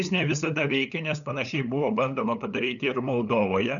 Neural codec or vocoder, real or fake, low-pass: codec, 16 kHz, 8 kbps, FunCodec, trained on LibriTTS, 25 frames a second; fake; 7.2 kHz